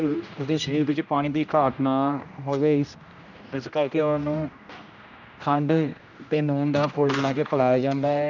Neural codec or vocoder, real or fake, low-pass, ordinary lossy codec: codec, 16 kHz, 1 kbps, X-Codec, HuBERT features, trained on general audio; fake; 7.2 kHz; none